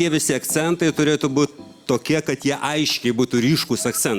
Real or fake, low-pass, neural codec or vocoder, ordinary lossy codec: real; 19.8 kHz; none; Opus, 64 kbps